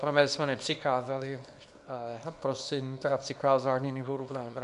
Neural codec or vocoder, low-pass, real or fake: codec, 24 kHz, 0.9 kbps, WavTokenizer, small release; 10.8 kHz; fake